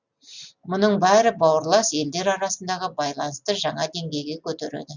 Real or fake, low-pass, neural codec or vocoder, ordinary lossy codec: real; 7.2 kHz; none; Opus, 64 kbps